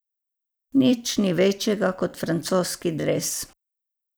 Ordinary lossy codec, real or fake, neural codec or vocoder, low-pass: none; fake; vocoder, 44.1 kHz, 128 mel bands every 256 samples, BigVGAN v2; none